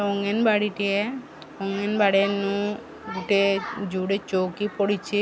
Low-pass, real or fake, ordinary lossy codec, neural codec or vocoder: none; real; none; none